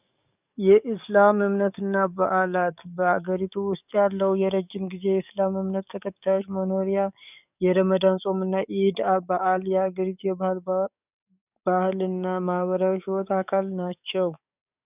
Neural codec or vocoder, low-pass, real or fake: codec, 44.1 kHz, 7.8 kbps, DAC; 3.6 kHz; fake